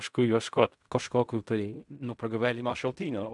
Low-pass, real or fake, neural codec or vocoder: 10.8 kHz; fake; codec, 16 kHz in and 24 kHz out, 0.4 kbps, LongCat-Audio-Codec, fine tuned four codebook decoder